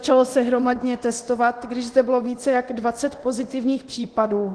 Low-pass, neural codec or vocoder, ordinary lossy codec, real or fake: 10.8 kHz; codec, 24 kHz, 0.9 kbps, DualCodec; Opus, 16 kbps; fake